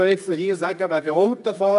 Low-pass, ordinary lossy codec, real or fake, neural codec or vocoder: 10.8 kHz; none; fake; codec, 24 kHz, 0.9 kbps, WavTokenizer, medium music audio release